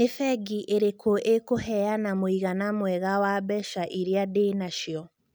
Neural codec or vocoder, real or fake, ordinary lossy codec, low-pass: none; real; none; none